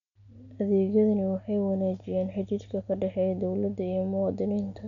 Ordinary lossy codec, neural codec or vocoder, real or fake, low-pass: none; none; real; 7.2 kHz